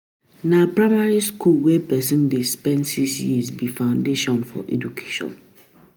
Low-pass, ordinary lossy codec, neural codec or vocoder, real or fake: none; none; none; real